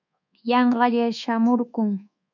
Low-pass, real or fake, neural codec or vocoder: 7.2 kHz; fake; codec, 24 kHz, 1.2 kbps, DualCodec